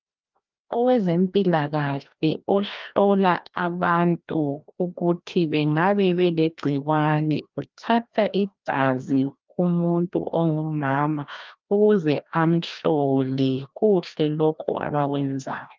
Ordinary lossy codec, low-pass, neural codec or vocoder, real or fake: Opus, 32 kbps; 7.2 kHz; codec, 16 kHz, 1 kbps, FreqCodec, larger model; fake